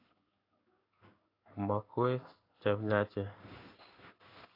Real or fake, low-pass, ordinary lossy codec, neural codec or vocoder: fake; 5.4 kHz; AAC, 32 kbps; codec, 16 kHz in and 24 kHz out, 1 kbps, XY-Tokenizer